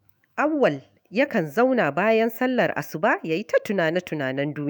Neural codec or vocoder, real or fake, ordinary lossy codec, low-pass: autoencoder, 48 kHz, 128 numbers a frame, DAC-VAE, trained on Japanese speech; fake; none; none